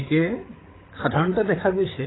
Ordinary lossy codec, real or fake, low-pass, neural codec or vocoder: AAC, 16 kbps; fake; 7.2 kHz; codec, 16 kHz, 16 kbps, FreqCodec, larger model